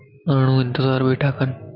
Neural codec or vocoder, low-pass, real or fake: none; 5.4 kHz; real